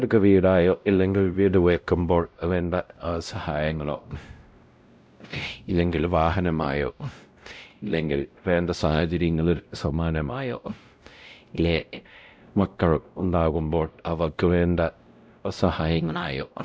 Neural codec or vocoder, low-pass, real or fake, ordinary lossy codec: codec, 16 kHz, 0.5 kbps, X-Codec, WavLM features, trained on Multilingual LibriSpeech; none; fake; none